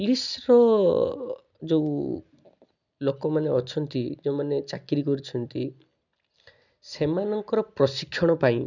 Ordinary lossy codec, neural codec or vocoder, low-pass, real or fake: none; none; 7.2 kHz; real